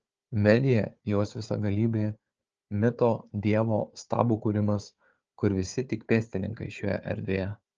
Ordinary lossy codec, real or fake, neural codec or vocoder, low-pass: Opus, 24 kbps; fake; codec, 16 kHz, 4 kbps, FunCodec, trained on Chinese and English, 50 frames a second; 7.2 kHz